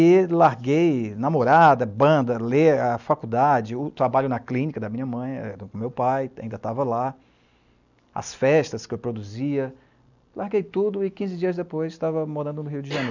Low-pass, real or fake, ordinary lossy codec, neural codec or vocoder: 7.2 kHz; real; none; none